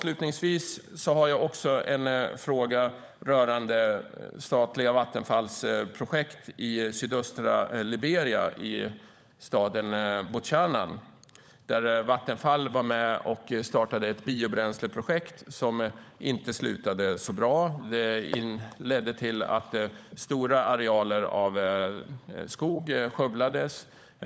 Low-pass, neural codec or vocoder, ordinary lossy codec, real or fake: none; codec, 16 kHz, 16 kbps, FunCodec, trained on LibriTTS, 50 frames a second; none; fake